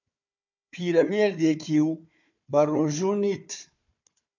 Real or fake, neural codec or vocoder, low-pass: fake; codec, 16 kHz, 4 kbps, FunCodec, trained on Chinese and English, 50 frames a second; 7.2 kHz